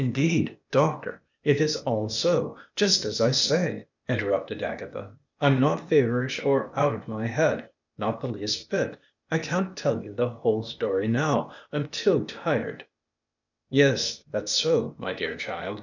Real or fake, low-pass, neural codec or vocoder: fake; 7.2 kHz; codec, 16 kHz, 0.8 kbps, ZipCodec